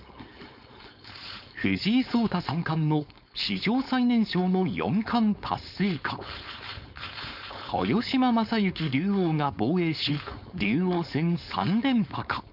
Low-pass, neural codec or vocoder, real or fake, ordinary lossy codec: 5.4 kHz; codec, 16 kHz, 4.8 kbps, FACodec; fake; none